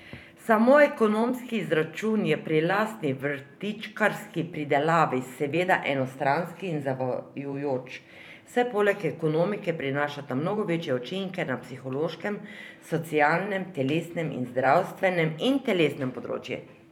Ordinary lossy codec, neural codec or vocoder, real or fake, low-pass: none; vocoder, 44.1 kHz, 128 mel bands every 512 samples, BigVGAN v2; fake; 19.8 kHz